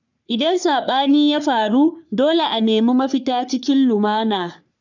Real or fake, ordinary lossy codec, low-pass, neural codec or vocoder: fake; none; 7.2 kHz; codec, 44.1 kHz, 3.4 kbps, Pupu-Codec